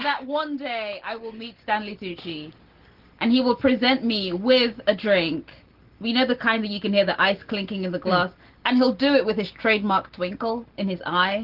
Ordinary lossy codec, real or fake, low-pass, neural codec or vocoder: Opus, 16 kbps; real; 5.4 kHz; none